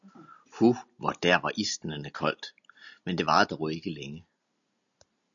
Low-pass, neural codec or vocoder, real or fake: 7.2 kHz; none; real